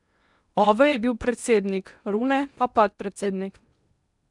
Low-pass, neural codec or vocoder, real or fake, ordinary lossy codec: 10.8 kHz; codec, 16 kHz in and 24 kHz out, 0.8 kbps, FocalCodec, streaming, 65536 codes; fake; none